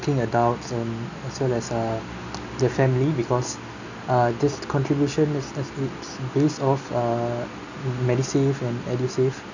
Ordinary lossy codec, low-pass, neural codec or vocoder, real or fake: none; 7.2 kHz; none; real